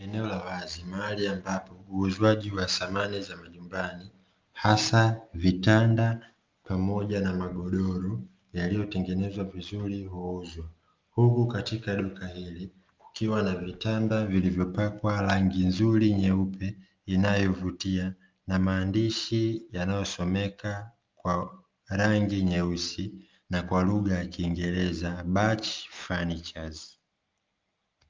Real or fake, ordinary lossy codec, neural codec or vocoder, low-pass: real; Opus, 32 kbps; none; 7.2 kHz